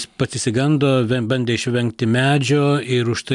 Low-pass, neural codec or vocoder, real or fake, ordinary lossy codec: 10.8 kHz; none; real; MP3, 96 kbps